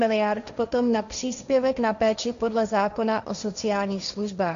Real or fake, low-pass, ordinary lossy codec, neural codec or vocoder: fake; 7.2 kHz; MP3, 64 kbps; codec, 16 kHz, 1.1 kbps, Voila-Tokenizer